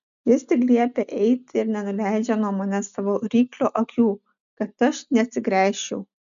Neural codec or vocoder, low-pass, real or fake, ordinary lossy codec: none; 7.2 kHz; real; MP3, 96 kbps